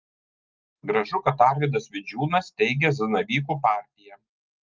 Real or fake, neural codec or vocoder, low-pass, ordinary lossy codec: real; none; 7.2 kHz; Opus, 32 kbps